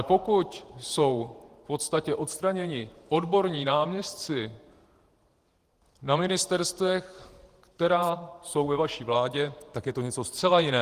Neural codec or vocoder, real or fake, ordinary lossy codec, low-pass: vocoder, 48 kHz, 128 mel bands, Vocos; fake; Opus, 24 kbps; 14.4 kHz